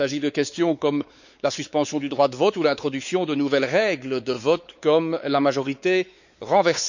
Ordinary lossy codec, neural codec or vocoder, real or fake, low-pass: none; codec, 16 kHz, 4 kbps, X-Codec, WavLM features, trained on Multilingual LibriSpeech; fake; 7.2 kHz